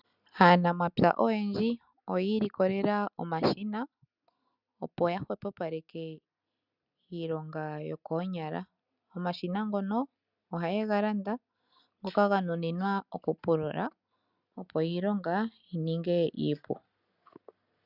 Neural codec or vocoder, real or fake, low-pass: none; real; 5.4 kHz